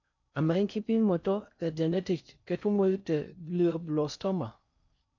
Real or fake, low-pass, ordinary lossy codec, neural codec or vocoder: fake; 7.2 kHz; none; codec, 16 kHz in and 24 kHz out, 0.6 kbps, FocalCodec, streaming, 4096 codes